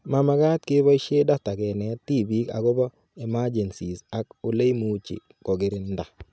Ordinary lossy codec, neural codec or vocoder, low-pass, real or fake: none; none; none; real